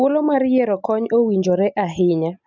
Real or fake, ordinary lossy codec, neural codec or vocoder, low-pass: real; none; none; 7.2 kHz